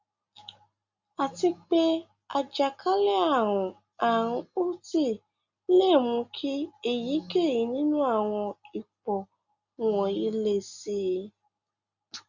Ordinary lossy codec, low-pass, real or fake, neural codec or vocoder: Opus, 64 kbps; 7.2 kHz; real; none